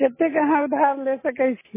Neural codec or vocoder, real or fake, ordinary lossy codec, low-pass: none; real; MP3, 16 kbps; 3.6 kHz